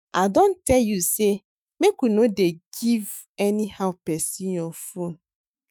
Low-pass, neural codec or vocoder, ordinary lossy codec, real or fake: none; autoencoder, 48 kHz, 128 numbers a frame, DAC-VAE, trained on Japanese speech; none; fake